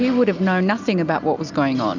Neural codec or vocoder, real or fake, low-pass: none; real; 7.2 kHz